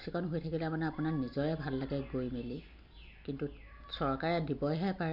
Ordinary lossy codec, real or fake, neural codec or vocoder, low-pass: none; real; none; 5.4 kHz